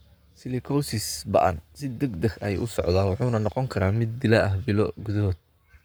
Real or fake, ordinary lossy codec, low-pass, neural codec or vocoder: fake; none; none; codec, 44.1 kHz, 7.8 kbps, Pupu-Codec